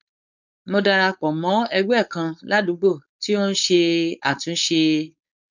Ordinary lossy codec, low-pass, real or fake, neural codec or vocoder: none; 7.2 kHz; fake; codec, 16 kHz, 4.8 kbps, FACodec